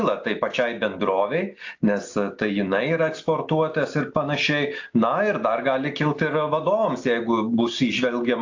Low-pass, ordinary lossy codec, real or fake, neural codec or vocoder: 7.2 kHz; AAC, 48 kbps; real; none